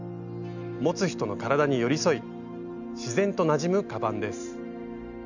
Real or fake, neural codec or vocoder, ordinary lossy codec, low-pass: real; none; none; 7.2 kHz